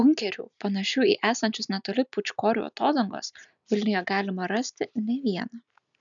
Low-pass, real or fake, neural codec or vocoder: 7.2 kHz; real; none